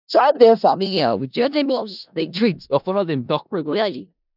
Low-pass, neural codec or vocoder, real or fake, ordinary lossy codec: 5.4 kHz; codec, 16 kHz in and 24 kHz out, 0.4 kbps, LongCat-Audio-Codec, four codebook decoder; fake; none